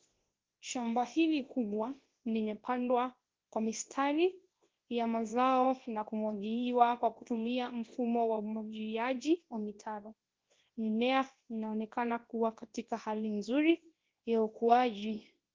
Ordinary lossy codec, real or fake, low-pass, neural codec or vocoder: Opus, 16 kbps; fake; 7.2 kHz; codec, 24 kHz, 0.9 kbps, WavTokenizer, large speech release